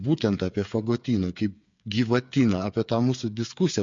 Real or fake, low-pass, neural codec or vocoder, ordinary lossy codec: fake; 7.2 kHz; codec, 16 kHz, 8 kbps, FreqCodec, smaller model; MP3, 48 kbps